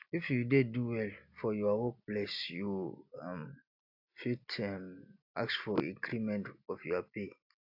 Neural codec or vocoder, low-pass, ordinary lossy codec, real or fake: none; 5.4 kHz; AAC, 48 kbps; real